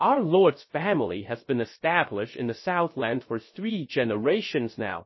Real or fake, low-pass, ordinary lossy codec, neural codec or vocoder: fake; 7.2 kHz; MP3, 24 kbps; codec, 16 kHz, 0.3 kbps, FocalCodec